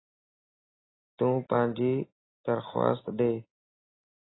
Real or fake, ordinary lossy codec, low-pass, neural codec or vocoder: real; AAC, 16 kbps; 7.2 kHz; none